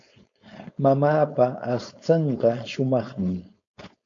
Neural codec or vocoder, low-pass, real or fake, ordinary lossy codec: codec, 16 kHz, 4.8 kbps, FACodec; 7.2 kHz; fake; MP3, 64 kbps